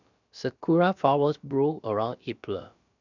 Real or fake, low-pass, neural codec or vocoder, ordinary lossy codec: fake; 7.2 kHz; codec, 16 kHz, about 1 kbps, DyCAST, with the encoder's durations; none